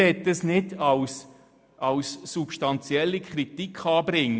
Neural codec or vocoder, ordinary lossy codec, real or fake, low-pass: none; none; real; none